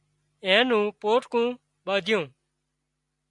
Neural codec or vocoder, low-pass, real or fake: none; 10.8 kHz; real